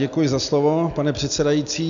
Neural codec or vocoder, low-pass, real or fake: none; 7.2 kHz; real